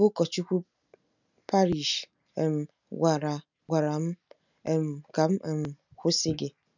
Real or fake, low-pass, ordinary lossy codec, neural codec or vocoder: real; 7.2 kHz; none; none